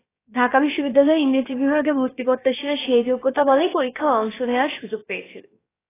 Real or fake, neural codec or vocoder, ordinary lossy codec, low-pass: fake; codec, 16 kHz, about 1 kbps, DyCAST, with the encoder's durations; AAC, 16 kbps; 3.6 kHz